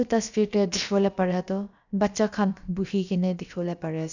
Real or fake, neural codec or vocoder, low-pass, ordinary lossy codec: fake; codec, 16 kHz, about 1 kbps, DyCAST, with the encoder's durations; 7.2 kHz; none